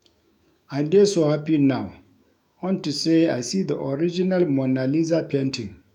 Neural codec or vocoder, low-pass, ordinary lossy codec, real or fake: autoencoder, 48 kHz, 128 numbers a frame, DAC-VAE, trained on Japanese speech; 19.8 kHz; none; fake